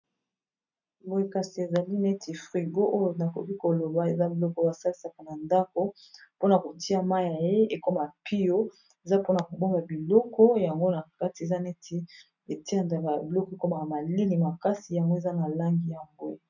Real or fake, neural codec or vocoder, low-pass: real; none; 7.2 kHz